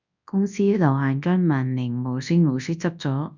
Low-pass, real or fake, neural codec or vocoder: 7.2 kHz; fake; codec, 24 kHz, 0.9 kbps, WavTokenizer, large speech release